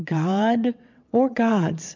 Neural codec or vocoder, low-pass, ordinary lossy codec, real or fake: vocoder, 22.05 kHz, 80 mel bands, WaveNeXt; 7.2 kHz; MP3, 64 kbps; fake